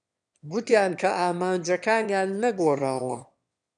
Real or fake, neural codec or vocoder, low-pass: fake; autoencoder, 22.05 kHz, a latent of 192 numbers a frame, VITS, trained on one speaker; 9.9 kHz